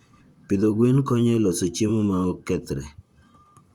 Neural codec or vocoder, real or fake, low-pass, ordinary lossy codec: vocoder, 44.1 kHz, 128 mel bands, Pupu-Vocoder; fake; 19.8 kHz; Opus, 64 kbps